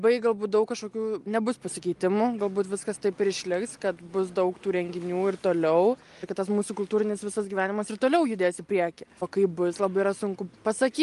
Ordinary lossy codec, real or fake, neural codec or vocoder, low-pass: Opus, 32 kbps; real; none; 10.8 kHz